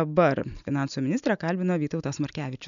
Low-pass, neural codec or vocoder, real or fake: 7.2 kHz; none; real